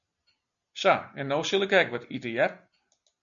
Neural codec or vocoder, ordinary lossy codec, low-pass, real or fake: none; MP3, 96 kbps; 7.2 kHz; real